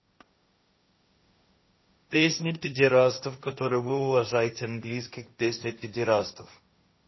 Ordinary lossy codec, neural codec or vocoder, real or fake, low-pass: MP3, 24 kbps; codec, 16 kHz, 1.1 kbps, Voila-Tokenizer; fake; 7.2 kHz